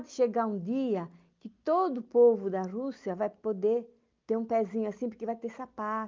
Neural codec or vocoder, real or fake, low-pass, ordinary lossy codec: none; real; 7.2 kHz; Opus, 32 kbps